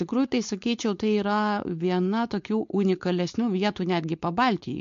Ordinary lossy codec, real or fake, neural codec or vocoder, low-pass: MP3, 48 kbps; fake; codec, 16 kHz, 4.8 kbps, FACodec; 7.2 kHz